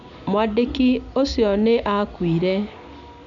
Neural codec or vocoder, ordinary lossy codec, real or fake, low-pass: none; none; real; 7.2 kHz